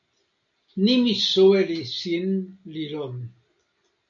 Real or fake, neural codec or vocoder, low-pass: real; none; 7.2 kHz